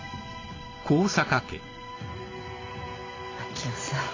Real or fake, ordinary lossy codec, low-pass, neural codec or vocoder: real; AAC, 32 kbps; 7.2 kHz; none